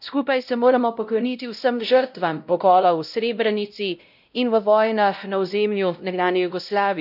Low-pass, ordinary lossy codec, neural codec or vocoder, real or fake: 5.4 kHz; none; codec, 16 kHz, 0.5 kbps, X-Codec, WavLM features, trained on Multilingual LibriSpeech; fake